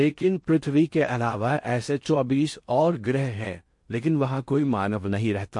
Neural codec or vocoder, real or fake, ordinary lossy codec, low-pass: codec, 16 kHz in and 24 kHz out, 0.6 kbps, FocalCodec, streaming, 4096 codes; fake; MP3, 48 kbps; 10.8 kHz